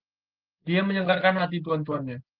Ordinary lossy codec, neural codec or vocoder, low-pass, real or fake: Opus, 16 kbps; none; 5.4 kHz; real